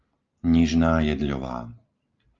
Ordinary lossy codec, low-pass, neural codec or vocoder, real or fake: Opus, 32 kbps; 7.2 kHz; none; real